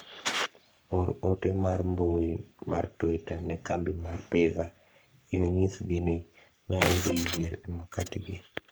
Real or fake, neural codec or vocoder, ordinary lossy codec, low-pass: fake; codec, 44.1 kHz, 3.4 kbps, Pupu-Codec; none; none